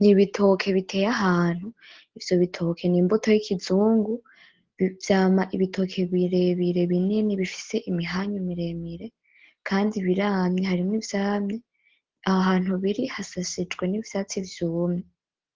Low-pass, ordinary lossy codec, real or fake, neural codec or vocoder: 7.2 kHz; Opus, 16 kbps; real; none